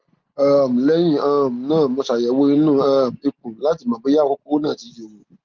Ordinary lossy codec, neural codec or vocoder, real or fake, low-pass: Opus, 32 kbps; none; real; 7.2 kHz